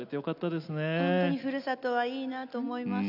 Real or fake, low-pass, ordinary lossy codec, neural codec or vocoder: real; 5.4 kHz; none; none